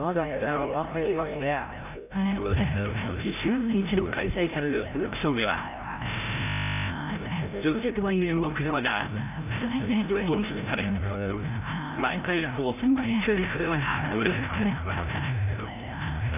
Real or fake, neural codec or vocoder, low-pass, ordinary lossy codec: fake; codec, 16 kHz, 0.5 kbps, FreqCodec, larger model; 3.6 kHz; none